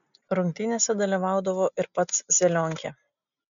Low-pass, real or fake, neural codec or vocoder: 7.2 kHz; real; none